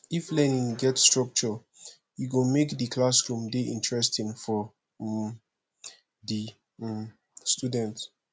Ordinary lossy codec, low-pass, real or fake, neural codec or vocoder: none; none; real; none